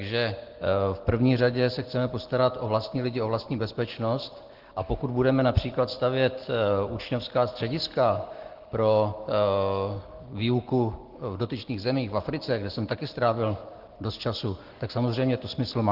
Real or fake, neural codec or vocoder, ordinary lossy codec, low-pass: real; none; Opus, 16 kbps; 5.4 kHz